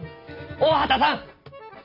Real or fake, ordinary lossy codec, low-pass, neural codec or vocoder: real; none; 5.4 kHz; none